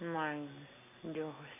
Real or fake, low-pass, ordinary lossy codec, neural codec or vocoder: real; 3.6 kHz; none; none